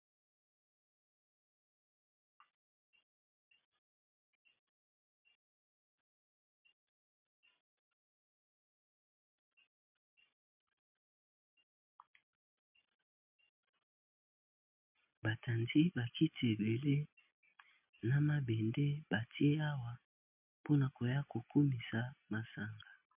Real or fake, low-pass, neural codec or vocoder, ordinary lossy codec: real; 3.6 kHz; none; MP3, 32 kbps